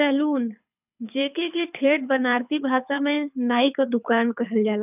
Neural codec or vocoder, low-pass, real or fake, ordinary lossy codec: codec, 24 kHz, 6 kbps, HILCodec; 3.6 kHz; fake; none